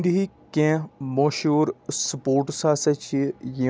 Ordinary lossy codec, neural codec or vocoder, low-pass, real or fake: none; none; none; real